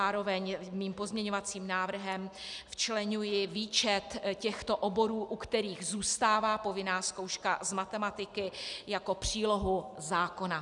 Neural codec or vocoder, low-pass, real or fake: none; 10.8 kHz; real